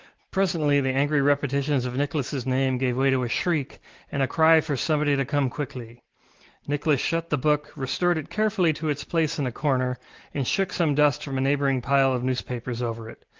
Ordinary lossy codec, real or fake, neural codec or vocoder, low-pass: Opus, 16 kbps; real; none; 7.2 kHz